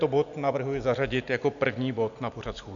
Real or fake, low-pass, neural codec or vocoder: real; 7.2 kHz; none